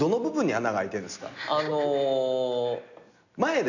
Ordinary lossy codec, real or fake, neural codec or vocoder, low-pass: none; real; none; 7.2 kHz